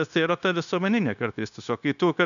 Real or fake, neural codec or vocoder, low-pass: fake; codec, 16 kHz, 0.9 kbps, LongCat-Audio-Codec; 7.2 kHz